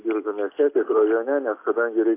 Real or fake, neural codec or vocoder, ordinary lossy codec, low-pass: real; none; AAC, 24 kbps; 3.6 kHz